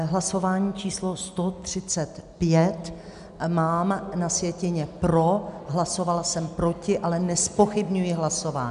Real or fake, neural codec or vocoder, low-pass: real; none; 10.8 kHz